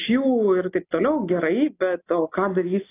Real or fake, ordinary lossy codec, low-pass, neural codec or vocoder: real; AAC, 24 kbps; 3.6 kHz; none